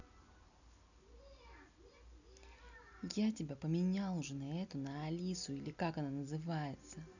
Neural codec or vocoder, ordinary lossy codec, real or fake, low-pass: none; none; real; 7.2 kHz